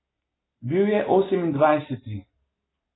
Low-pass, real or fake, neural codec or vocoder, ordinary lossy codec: 7.2 kHz; real; none; AAC, 16 kbps